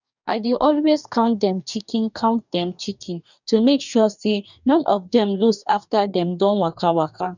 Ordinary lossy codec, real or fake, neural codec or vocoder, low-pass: none; fake; codec, 44.1 kHz, 2.6 kbps, DAC; 7.2 kHz